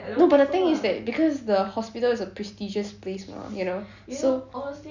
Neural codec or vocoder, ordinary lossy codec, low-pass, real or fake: none; none; 7.2 kHz; real